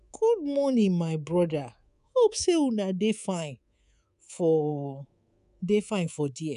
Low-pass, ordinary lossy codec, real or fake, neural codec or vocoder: 10.8 kHz; none; fake; codec, 24 kHz, 3.1 kbps, DualCodec